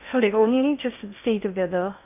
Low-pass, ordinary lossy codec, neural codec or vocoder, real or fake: 3.6 kHz; none; codec, 16 kHz in and 24 kHz out, 0.6 kbps, FocalCodec, streaming, 2048 codes; fake